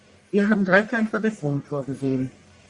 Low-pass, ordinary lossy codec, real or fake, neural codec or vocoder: 10.8 kHz; Opus, 64 kbps; fake; codec, 44.1 kHz, 1.7 kbps, Pupu-Codec